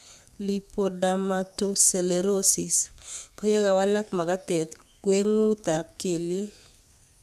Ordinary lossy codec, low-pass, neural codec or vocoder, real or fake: none; 14.4 kHz; codec, 32 kHz, 1.9 kbps, SNAC; fake